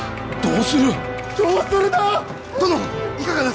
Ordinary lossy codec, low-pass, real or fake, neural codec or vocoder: none; none; real; none